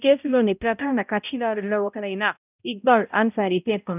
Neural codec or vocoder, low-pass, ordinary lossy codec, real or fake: codec, 16 kHz, 0.5 kbps, X-Codec, HuBERT features, trained on balanced general audio; 3.6 kHz; none; fake